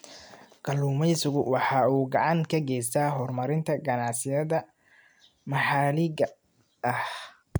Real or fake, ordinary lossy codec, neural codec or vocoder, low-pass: real; none; none; none